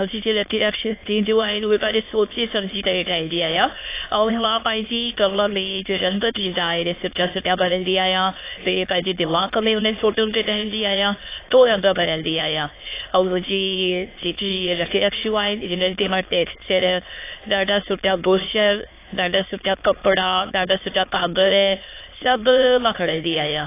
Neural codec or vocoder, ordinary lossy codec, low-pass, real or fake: autoencoder, 22.05 kHz, a latent of 192 numbers a frame, VITS, trained on many speakers; AAC, 24 kbps; 3.6 kHz; fake